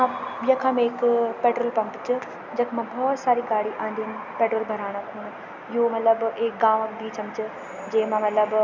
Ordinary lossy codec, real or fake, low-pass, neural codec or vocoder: none; real; 7.2 kHz; none